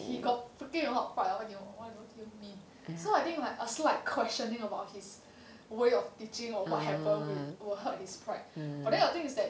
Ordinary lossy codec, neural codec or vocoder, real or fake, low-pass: none; none; real; none